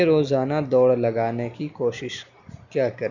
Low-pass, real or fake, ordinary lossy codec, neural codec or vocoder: 7.2 kHz; real; none; none